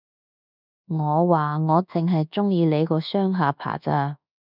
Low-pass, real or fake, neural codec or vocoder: 5.4 kHz; fake; codec, 24 kHz, 1.2 kbps, DualCodec